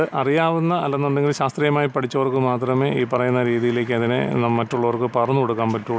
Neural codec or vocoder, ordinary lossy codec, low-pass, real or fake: none; none; none; real